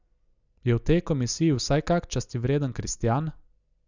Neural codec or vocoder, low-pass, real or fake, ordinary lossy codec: none; 7.2 kHz; real; none